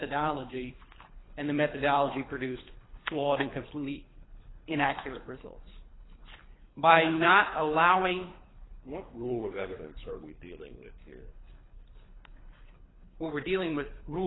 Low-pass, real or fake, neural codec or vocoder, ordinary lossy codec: 7.2 kHz; fake; codec, 24 kHz, 3 kbps, HILCodec; AAC, 16 kbps